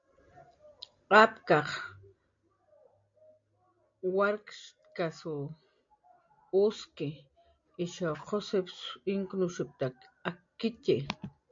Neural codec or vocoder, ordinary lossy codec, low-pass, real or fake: none; MP3, 48 kbps; 7.2 kHz; real